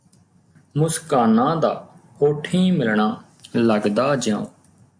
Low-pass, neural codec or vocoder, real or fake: 9.9 kHz; none; real